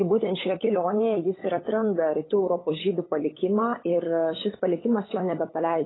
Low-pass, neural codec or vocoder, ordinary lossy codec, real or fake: 7.2 kHz; codec, 16 kHz, 8 kbps, FunCodec, trained on LibriTTS, 25 frames a second; AAC, 16 kbps; fake